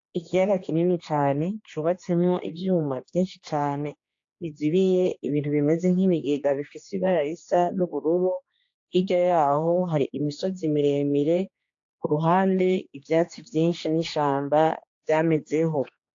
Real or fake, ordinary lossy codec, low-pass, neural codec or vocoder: fake; AAC, 48 kbps; 7.2 kHz; codec, 16 kHz, 2 kbps, X-Codec, HuBERT features, trained on general audio